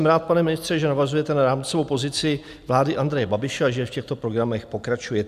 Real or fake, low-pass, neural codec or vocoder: real; 14.4 kHz; none